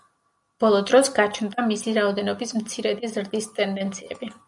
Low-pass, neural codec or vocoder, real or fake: 10.8 kHz; none; real